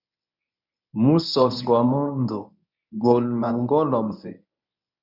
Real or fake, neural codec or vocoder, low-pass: fake; codec, 24 kHz, 0.9 kbps, WavTokenizer, medium speech release version 1; 5.4 kHz